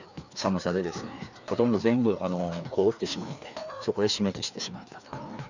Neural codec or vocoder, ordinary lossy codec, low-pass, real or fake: codec, 16 kHz, 2 kbps, FreqCodec, larger model; none; 7.2 kHz; fake